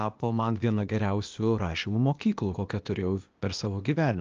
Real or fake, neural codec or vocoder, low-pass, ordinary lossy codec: fake; codec, 16 kHz, 0.8 kbps, ZipCodec; 7.2 kHz; Opus, 24 kbps